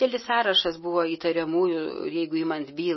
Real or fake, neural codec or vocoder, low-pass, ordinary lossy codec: real; none; 7.2 kHz; MP3, 24 kbps